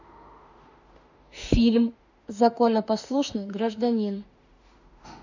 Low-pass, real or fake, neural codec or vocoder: 7.2 kHz; fake; autoencoder, 48 kHz, 32 numbers a frame, DAC-VAE, trained on Japanese speech